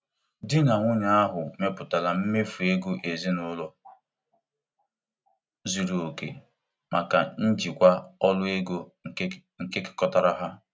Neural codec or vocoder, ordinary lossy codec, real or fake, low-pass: none; none; real; none